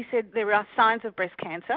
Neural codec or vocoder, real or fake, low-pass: none; real; 5.4 kHz